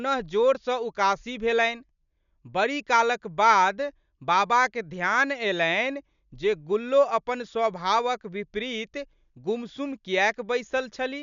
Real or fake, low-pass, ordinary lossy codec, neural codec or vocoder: real; 7.2 kHz; none; none